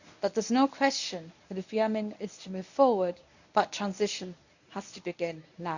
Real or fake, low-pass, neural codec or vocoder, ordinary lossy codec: fake; 7.2 kHz; codec, 24 kHz, 0.9 kbps, WavTokenizer, medium speech release version 1; none